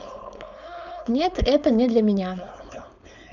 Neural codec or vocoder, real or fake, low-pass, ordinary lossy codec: codec, 16 kHz, 4.8 kbps, FACodec; fake; 7.2 kHz; none